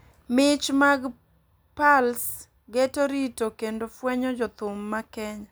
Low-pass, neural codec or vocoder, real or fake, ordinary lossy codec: none; none; real; none